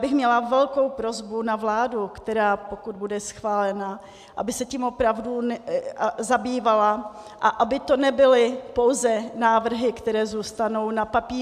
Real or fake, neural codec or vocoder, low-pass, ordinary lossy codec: real; none; 14.4 kHz; AAC, 96 kbps